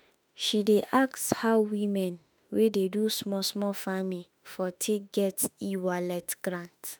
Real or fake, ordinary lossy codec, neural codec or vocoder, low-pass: fake; none; autoencoder, 48 kHz, 32 numbers a frame, DAC-VAE, trained on Japanese speech; none